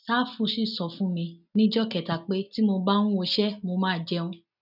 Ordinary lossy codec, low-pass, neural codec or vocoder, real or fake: none; 5.4 kHz; none; real